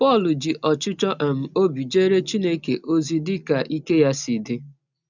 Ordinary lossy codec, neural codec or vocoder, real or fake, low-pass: none; none; real; 7.2 kHz